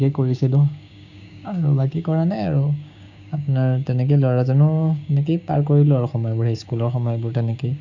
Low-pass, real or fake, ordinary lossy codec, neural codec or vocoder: 7.2 kHz; fake; none; codec, 16 kHz, 6 kbps, DAC